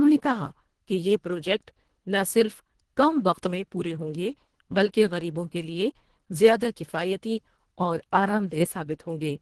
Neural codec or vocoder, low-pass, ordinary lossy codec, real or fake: codec, 24 kHz, 1.5 kbps, HILCodec; 10.8 kHz; Opus, 16 kbps; fake